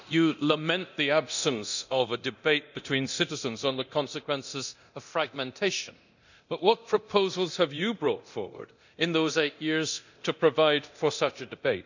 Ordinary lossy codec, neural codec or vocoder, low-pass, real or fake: none; codec, 24 kHz, 0.9 kbps, DualCodec; 7.2 kHz; fake